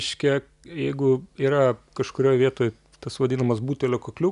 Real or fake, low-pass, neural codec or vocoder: real; 10.8 kHz; none